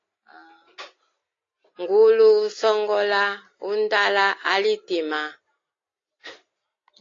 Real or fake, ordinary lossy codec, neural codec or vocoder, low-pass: real; AAC, 32 kbps; none; 7.2 kHz